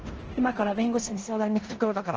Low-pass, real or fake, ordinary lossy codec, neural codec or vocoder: 7.2 kHz; fake; Opus, 16 kbps; codec, 16 kHz in and 24 kHz out, 0.9 kbps, LongCat-Audio-Codec, four codebook decoder